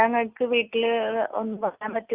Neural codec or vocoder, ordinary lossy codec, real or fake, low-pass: none; Opus, 32 kbps; real; 3.6 kHz